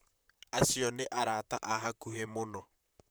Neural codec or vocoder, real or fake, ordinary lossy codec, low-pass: vocoder, 44.1 kHz, 128 mel bands, Pupu-Vocoder; fake; none; none